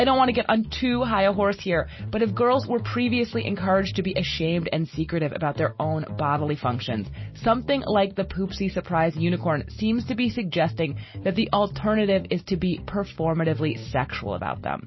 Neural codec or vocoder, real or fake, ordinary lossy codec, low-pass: none; real; MP3, 24 kbps; 7.2 kHz